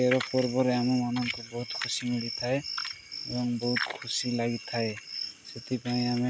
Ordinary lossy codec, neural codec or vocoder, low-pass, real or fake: none; none; none; real